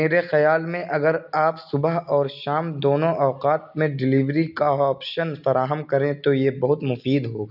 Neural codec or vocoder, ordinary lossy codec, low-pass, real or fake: none; none; 5.4 kHz; real